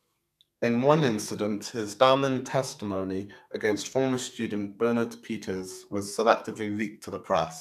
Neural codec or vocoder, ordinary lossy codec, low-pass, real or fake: codec, 32 kHz, 1.9 kbps, SNAC; none; 14.4 kHz; fake